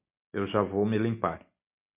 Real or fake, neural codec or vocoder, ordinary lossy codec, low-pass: real; none; MP3, 32 kbps; 3.6 kHz